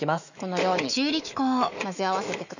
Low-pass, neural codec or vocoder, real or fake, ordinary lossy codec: 7.2 kHz; autoencoder, 48 kHz, 128 numbers a frame, DAC-VAE, trained on Japanese speech; fake; none